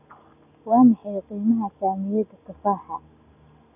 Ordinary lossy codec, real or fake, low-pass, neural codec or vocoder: none; real; 3.6 kHz; none